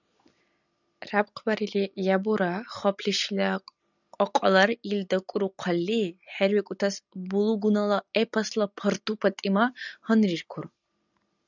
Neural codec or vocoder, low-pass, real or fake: none; 7.2 kHz; real